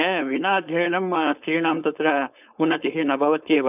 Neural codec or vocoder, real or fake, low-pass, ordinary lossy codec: codec, 16 kHz, 4.8 kbps, FACodec; fake; 3.6 kHz; none